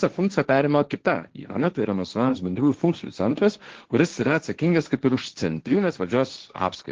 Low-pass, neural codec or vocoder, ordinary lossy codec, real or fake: 7.2 kHz; codec, 16 kHz, 1.1 kbps, Voila-Tokenizer; Opus, 16 kbps; fake